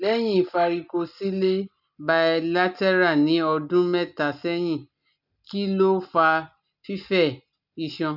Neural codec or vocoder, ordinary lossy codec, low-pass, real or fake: none; none; 5.4 kHz; real